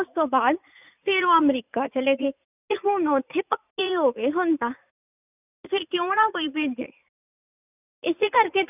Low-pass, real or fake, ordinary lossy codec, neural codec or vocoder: 3.6 kHz; fake; none; vocoder, 44.1 kHz, 80 mel bands, Vocos